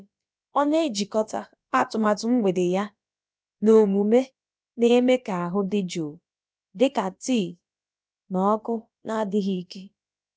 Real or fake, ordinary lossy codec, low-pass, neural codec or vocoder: fake; none; none; codec, 16 kHz, about 1 kbps, DyCAST, with the encoder's durations